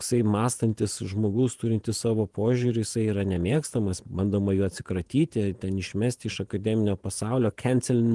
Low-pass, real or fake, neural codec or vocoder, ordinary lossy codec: 10.8 kHz; fake; vocoder, 48 kHz, 128 mel bands, Vocos; Opus, 24 kbps